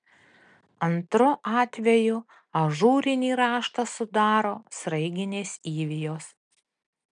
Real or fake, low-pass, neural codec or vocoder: real; 9.9 kHz; none